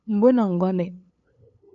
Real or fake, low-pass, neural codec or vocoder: fake; 7.2 kHz; codec, 16 kHz, 8 kbps, FunCodec, trained on LibriTTS, 25 frames a second